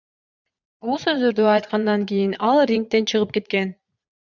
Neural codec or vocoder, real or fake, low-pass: vocoder, 22.05 kHz, 80 mel bands, Vocos; fake; 7.2 kHz